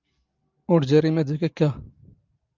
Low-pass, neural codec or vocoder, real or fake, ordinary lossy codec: 7.2 kHz; none; real; Opus, 24 kbps